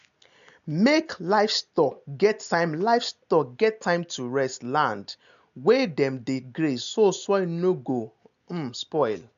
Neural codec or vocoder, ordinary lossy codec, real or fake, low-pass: none; none; real; 7.2 kHz